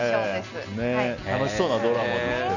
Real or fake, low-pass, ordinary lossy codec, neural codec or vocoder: real; 7.2 kHz; none; none